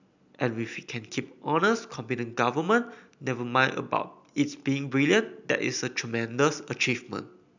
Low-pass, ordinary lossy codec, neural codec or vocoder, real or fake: 7.2 kHz; none; none; real